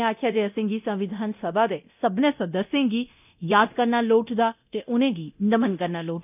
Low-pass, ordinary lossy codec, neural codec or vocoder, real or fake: 3.6 kHz; none; codec, 24 kHz, 0.9 kbps, DualCodec; fake